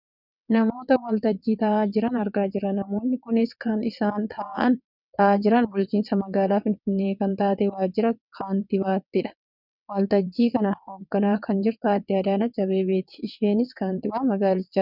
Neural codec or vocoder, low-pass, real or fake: vocoder, 22.05 kHz, 80 mel bands, WaveNeXt; 5.4 kHz; fake